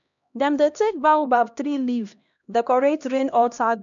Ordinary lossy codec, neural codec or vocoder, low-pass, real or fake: none; codec, 16 kHz, 1 kbps, X-Codec, HuBERT features, trained on LibriSpeech; 7.2 kHz; fake